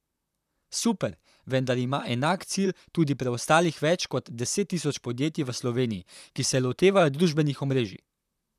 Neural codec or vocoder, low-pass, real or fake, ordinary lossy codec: vocoder, 44.1 kHz, 128 mel bands, Pupu-Vocoder; 14.4 kHz; fake; none